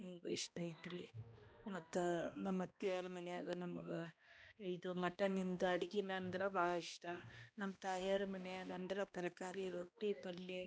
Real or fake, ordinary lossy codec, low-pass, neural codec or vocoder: fake; none; none; codec, 16 kHz, 1 kbps, X-Codec, HuBERT features, trained on balanced general audio